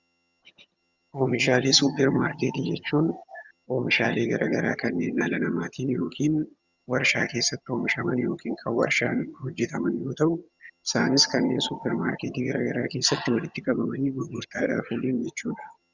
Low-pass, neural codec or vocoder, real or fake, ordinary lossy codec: 7.2 kHz; vocoder, 22.05 kHz, 80 mel bands, HiFi-GAN; fake; Opus, 64 kbps